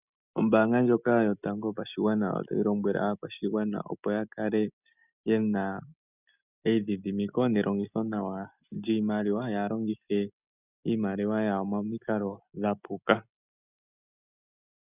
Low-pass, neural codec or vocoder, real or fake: 3.6 kHz; none; real